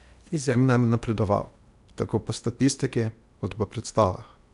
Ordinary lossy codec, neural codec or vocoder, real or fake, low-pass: none; codec, 16 kHz in and 24 kHz out, 0.8 kbps, FocalCodec, streaming, 65536 codes; fake; 10.8 kHz